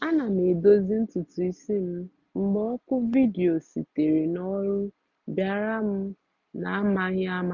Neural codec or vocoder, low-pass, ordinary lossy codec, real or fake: none; 7.2 kHz; none; real